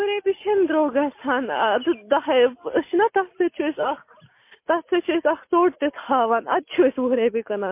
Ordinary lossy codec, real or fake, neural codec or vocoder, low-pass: MP3, 24 kbps; real; none; 3.6 kHz